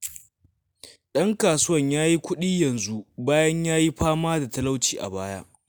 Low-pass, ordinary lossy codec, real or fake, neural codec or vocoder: none; none; real; none